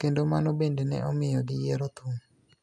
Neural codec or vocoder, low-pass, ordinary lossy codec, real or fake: none; none; none; real